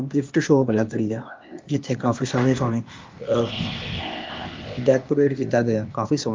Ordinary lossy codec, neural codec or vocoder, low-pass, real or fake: Opus, 32 kbps; codec, 16 kHz, 0.8 kbps, ZipCodec; 7.2 kHz; fake